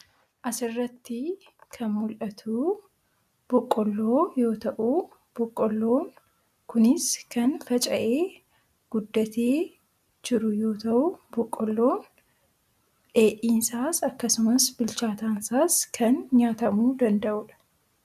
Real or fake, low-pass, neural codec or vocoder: real; 14.4 kHz; none